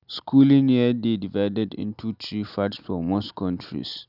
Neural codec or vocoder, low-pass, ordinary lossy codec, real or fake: none; 5.4 kHz; none; real